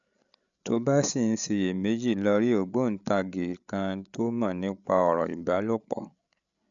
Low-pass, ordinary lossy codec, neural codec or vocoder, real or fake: 7.2 kHz; none; codec, 16 kHz, 16 kbps, FreqCodec, larger model; fake